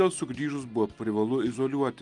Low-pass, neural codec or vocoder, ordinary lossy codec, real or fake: 10.8 kHz; none; Opus, 32 kbps; real